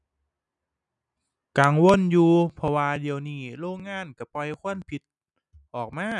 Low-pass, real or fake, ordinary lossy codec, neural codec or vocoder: 10.8 kHz; real; none; none